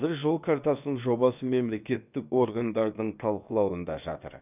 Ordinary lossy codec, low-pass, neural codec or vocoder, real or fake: none; 3.6 kHz; codec, 16 kHz, about 1 kbps, DyCAST, with the encoder's durations; fake